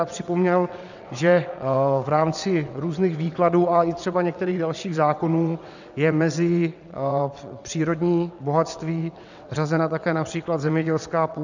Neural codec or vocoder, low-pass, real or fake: vocoder, 22.05 kHz, 80 mel bands, WaveNeXt; 7.2 kHz; fake